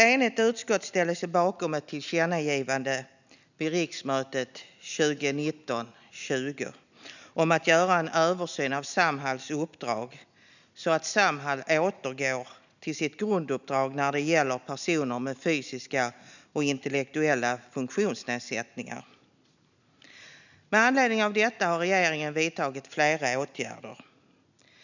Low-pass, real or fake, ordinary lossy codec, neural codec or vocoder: 7.2 kHz; real; none; none